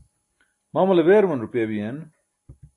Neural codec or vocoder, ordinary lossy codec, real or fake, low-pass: none; AAC, 48 kbps; real; 10.8 kHz